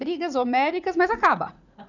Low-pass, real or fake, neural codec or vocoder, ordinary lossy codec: 7.2 kHz; fake; vocoder, 44.1 kHz, 80 mel bands, Vocos; none